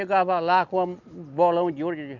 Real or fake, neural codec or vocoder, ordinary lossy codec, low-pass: real; none; none; 7.2 kHz